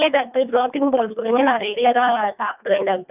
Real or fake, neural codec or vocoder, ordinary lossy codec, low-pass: fake; codec, 24 kHz, 1.5 kbps, HILCodec; none; 3.6 kHz